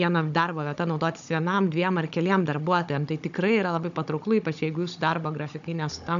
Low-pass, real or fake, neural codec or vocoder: 7.2 kHz; fake; codec, 16 kHz, 16 kbps, FunCodec, trained on Chinese and English, 50 frames a second